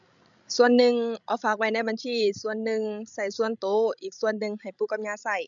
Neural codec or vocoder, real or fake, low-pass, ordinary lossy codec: codec, 16 kHz, 16 kbps, FreqCodec, larger model; fake; 7.2 kHz; MP3, 96 kbps